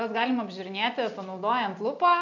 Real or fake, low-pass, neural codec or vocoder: real; 7.2 kHz; none